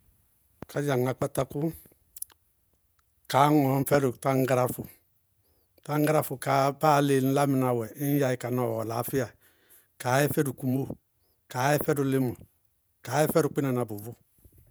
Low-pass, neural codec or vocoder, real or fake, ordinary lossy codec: none; vocoder, 48 kHz, 128 mel bands, Vocos; fake; none